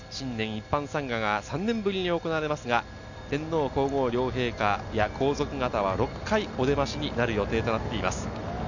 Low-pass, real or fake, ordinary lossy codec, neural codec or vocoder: 7.2 kHz; real; none; none